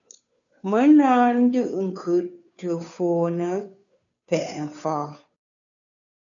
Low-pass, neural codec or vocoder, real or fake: 7.2 kHz; codec, 16 kHz, 2 kbps, FunCodec, trained on Chinese and English, 25 frames a second; fake